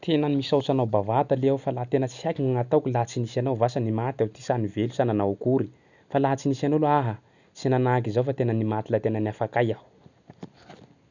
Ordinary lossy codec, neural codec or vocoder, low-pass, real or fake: none; none; 7.2 kHz; real